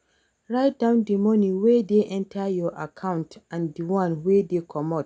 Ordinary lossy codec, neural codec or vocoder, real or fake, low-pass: none; none; real; none